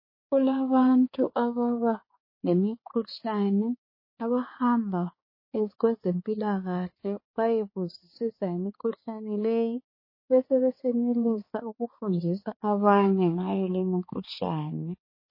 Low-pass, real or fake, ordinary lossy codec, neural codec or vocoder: 5.4 kHz; fake; MP3, 24 kbps; codec, 16 kHz, 4 kbps, X-Codec, HuBERT features, trained on balanced general audio